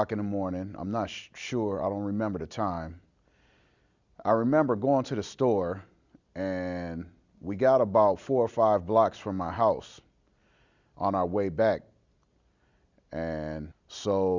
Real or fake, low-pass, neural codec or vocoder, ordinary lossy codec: real; 7.2 kHz; none; Opus, 64 kbps